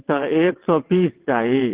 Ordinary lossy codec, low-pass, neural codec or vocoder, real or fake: Opus, 16 kbps; 3.6 kHz; vocoder, 22.05 kHz, 80 mel bands, WaveNeXt; fake